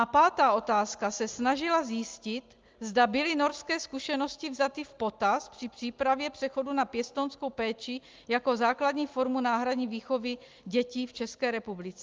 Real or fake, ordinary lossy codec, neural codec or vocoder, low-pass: real; Opus, 32 kbps; none; 7.2 kHz